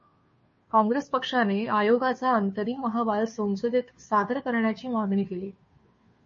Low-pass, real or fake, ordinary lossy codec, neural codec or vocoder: 7.2 kHz; fake; MP3, 32 kbps; codec, 16 kHz, 2 kbps, FunCodec, trained on Chinese and English, 25 frames a second